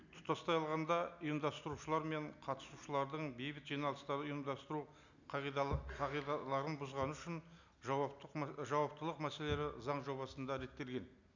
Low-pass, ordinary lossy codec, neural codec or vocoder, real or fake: 7.2 kHz; none; none; real